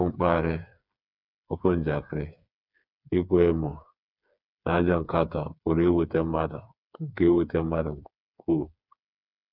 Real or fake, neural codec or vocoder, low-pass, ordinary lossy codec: fake; codec, 16 kHz, 4 kbps, FreqCodec, smaller model; 5.4 kHz; none